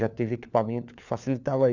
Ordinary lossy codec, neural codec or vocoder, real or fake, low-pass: none; codec, 16 kHz, 4 kbps, FunCodec, trained on LibriTTS, 50 frames a second; fake; 7.2 kHz